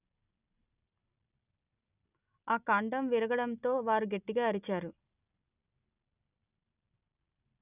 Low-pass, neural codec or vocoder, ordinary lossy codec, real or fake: 3.6 kHz; none; none; real